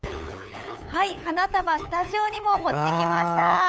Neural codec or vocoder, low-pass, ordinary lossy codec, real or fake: codec, 16 kHz, 8 kbps, FunCodec, trained on LibriTTS, 25 frames a second; none; none; fake